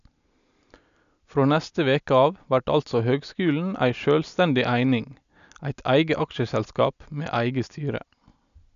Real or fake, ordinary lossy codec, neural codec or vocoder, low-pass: real; none; none; 7.2 kHz